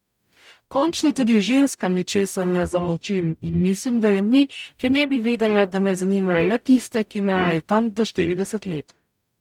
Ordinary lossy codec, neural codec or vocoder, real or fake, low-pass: none; codec, 44.1 kHz, 0.9 kbps, DAC; fake; 19.8 kHz